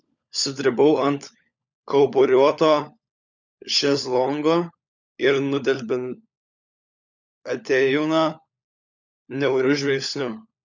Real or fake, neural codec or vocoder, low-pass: fake; codec, 16 kHz, 16 kbps, FunCodec, trained on LibriTTS, 50 frames a second; 7.2 kHz